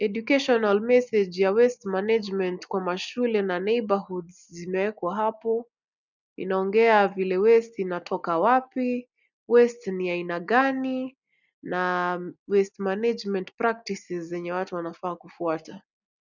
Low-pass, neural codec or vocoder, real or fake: 7.2 kHz; none; real